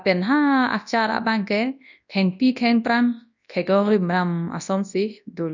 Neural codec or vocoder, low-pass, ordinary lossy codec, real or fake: codec, 24 kHz, 0.9 kbps, WavTokenizer, large speech release; 7.2 kHz; none; fake